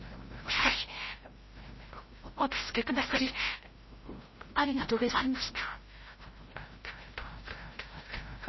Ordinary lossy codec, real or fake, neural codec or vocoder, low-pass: MP3, 24 kbps; fake; codec, 16 kHz, 0.5 kbps, FreqCodec, larger model; 7.2 kHz